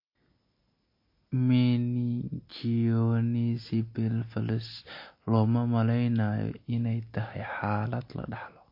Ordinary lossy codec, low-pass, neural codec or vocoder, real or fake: MP3, 32 kbps; 5.4 kHz; none; real